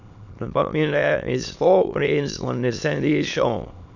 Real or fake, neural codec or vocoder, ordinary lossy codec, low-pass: fake; autoencoder, 22.05 kHz, a latent of 192 numbers a frame, VITS, trained on many speakers; none; 7.2 kHz